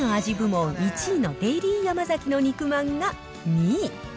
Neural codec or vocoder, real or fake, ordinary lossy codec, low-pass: none; real; none; none